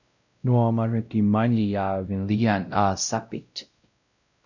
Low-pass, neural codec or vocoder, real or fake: 7.2 kHz; codec, 16 kHz, 0.5 kbps, X-Codec, WavLM features, trained on Multilingual LibriSpeech; fake